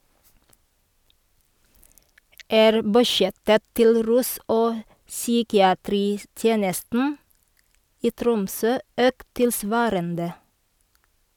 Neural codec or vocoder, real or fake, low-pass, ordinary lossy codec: none; real; 19.8 kHz; none